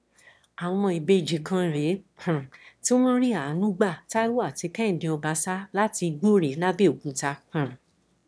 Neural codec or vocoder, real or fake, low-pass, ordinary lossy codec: autoencoder, 22.05 kHz, a latent of 192 numbers a frame, VITS, trained on one speaker; fake; none; none